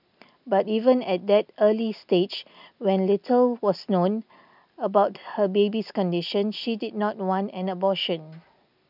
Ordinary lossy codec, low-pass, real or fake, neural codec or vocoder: none; 5.4 kHz; real; none